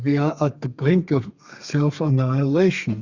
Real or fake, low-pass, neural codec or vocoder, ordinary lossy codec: fake; 7.2 kHz; codec, 32 kHz, 1.9 kbps, SNAC; Opus, 64 kbps